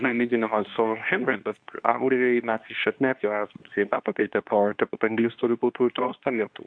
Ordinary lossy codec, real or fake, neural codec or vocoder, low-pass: AAC, 64 kbps; fake; codec, 24 kHz, 0.9 kbps, WavTokenizer, medium speech release version 2; 9.9 kHz